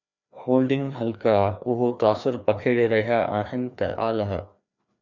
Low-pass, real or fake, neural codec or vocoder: 7.2 kHz; fake; codec, 16 kHz, 2 kbps, FreqCodec, larger model